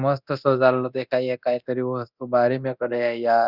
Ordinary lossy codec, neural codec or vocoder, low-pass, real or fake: Opus, 64 kbps; codec, 24 kHz, 0.9 kbps, DualCodec; 5.4 kHz; fake